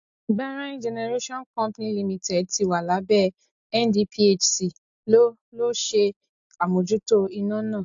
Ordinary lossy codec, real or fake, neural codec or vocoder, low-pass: AAC, 64 kbps; real; none; 7.2 kHz